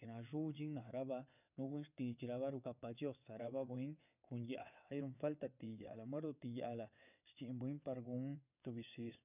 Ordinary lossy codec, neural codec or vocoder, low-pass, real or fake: none; vocoder, 22.05 kHz, 80 mel bands, WaveNeXt; 3.6 kHz; fake